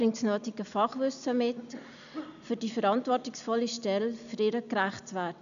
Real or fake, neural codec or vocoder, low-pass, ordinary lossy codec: real; none; 7.2 kHz; none